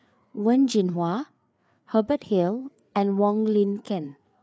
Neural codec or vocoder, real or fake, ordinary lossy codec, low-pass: codec, 16 kHz, 4 kbps, FreqCodec, larger model; fake; none; none